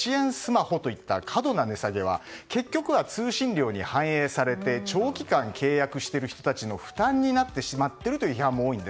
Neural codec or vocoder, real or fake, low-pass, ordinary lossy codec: none; real; none; none